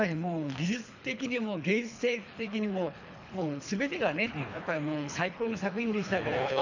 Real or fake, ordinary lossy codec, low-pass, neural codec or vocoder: fake; none; 7.2 kHz; codec, 24 kHz, 3 kbps, HILCodec